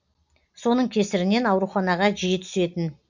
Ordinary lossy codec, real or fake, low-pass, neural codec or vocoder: none; real; 7.2 kHz; none